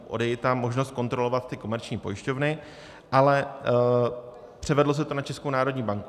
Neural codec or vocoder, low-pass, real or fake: none; 14.4 kHz; real